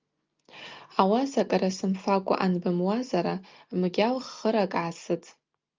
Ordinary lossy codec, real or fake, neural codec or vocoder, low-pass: Opus, 24 kbps; real; none; 7.2 kHz